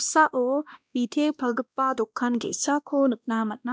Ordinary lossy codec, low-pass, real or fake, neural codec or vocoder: none; none; fake; codec, 16 kHz, 1 kbps, X-Codec, WavLM features, trained on Multilingual LibriSpeech